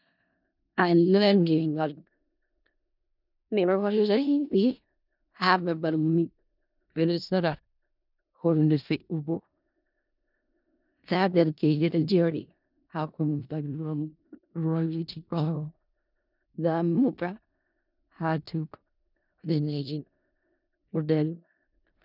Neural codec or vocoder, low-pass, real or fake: codec, 16 kHz in and 24 kHz out, 0.4 kbps, LongCat-Audio-Codec, four codebook decoder; 5.4 kHz; fake